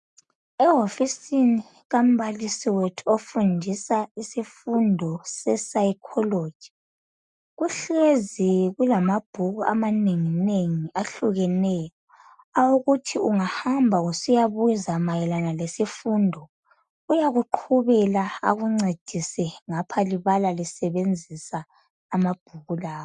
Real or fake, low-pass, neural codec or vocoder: real; 10.8 kHz; none